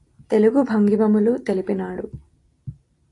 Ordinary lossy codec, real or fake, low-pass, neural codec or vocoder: AAC, 48 kbps; real; 10.8 kHz; none